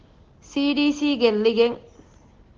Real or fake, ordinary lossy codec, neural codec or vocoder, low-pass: real; Opus, 16 kbps; none; 7.2 kHz